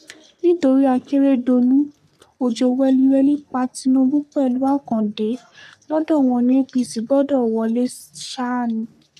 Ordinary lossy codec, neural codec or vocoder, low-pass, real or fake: none; codec, 44.1 kHz, 3.4 kbps, Pupu-Codec; 14.4 kHz; fake